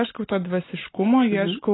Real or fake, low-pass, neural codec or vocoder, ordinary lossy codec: real; 7.2 kHz; none; AAC, 16 kbps